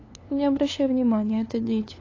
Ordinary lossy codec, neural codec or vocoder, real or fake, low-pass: AAC, 32 kbps; codec, 16 kHz, 2 kbps, FunCodec, trained on LibriTTS, 25 frames a second; fake; 7.2 kHz